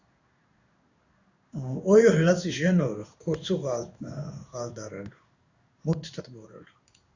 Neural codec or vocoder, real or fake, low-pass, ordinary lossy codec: codec, 16 kHz in and 24 kHz out, 1 kbps, XY-Tokenizer; fake; 7.2 kHz; Opus, 64 kbps